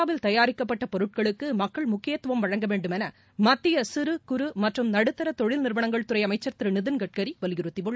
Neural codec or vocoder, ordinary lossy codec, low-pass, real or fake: none; none; none; real